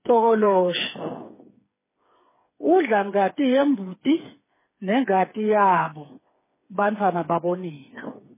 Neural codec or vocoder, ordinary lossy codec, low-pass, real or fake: codec, 16 kHz, 4 kbps, FreqCodec, smaller model; MP3, 16 kbps; 3.6 kHz; fake